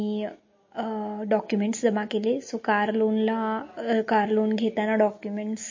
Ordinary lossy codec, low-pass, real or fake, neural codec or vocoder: MP3, 32 kbps; 7.2 kHz; real; none